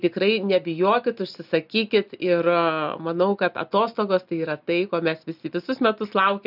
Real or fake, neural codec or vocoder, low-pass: real; none; 5.4 kHz